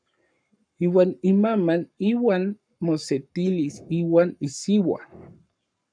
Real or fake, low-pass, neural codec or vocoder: fake; 9.9 kHz; codec, 44.1 kHz, 7.8 kbps, Pupu-Codec